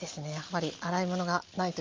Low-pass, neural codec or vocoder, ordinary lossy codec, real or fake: 7.2 kHz; none; Opus, 32 kbps; real